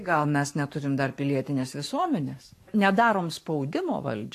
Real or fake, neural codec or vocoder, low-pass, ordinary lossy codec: fake; codec, 44.1 kHz, 7.8 kbps, Pupu-Codec; 14.4 kHz; AAC, 64 kbps